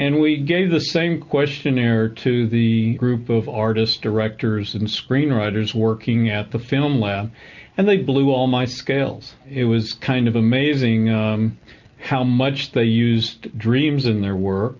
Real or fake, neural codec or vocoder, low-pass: real; none; 7.2 kHz